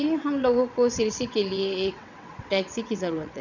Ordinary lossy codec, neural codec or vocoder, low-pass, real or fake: none; vocoder, 22.05 kHz, 80 mel bands, WaveNeXt; 7.2 kHz; fake